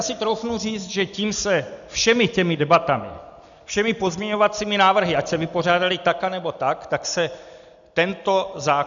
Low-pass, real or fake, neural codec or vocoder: 7.2 kHz; real; none